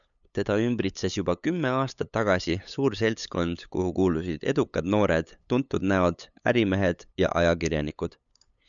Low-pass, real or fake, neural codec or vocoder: 7.2 kHz; fake; codec, 16 kHz, 8 kbps, FunCodec, trained on LibriTTS, 25 frames a second